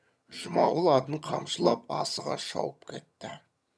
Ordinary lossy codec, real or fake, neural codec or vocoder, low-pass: none; fake; vocoder, 22.05 kHz, 80 mel bands, HiFi-GAN; none